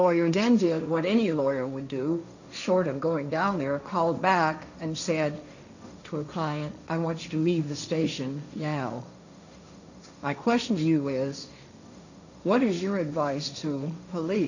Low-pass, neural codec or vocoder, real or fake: 7.2 kHz; codec, 16 kHz, 1.1 kbps, Voila-Tokenizer; fake